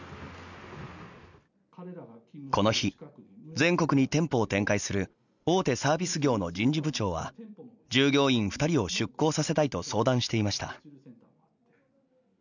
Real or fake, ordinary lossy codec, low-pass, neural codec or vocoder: real; none; 7.2 kHz; none